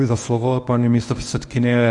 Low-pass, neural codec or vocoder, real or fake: 10.8 kHz; codec, 24 kHz, 0.9 kbps, WavTokenizer, medium speech release version 1; fake